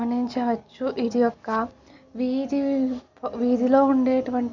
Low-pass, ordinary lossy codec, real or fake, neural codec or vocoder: 7.2 kHz; none; real; none